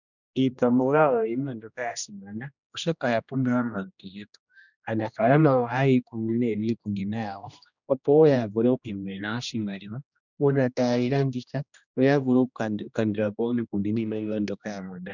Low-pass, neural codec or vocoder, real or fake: 7.2 kHz; codec, 16 kHz, 1 kbps, X-Codec, HuBERT features, trained on general audio; fake